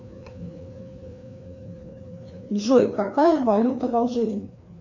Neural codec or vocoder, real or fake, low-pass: codec, 16 kHz, 2 kbps, FreqCodec, larger model; fake; 7.2 kHz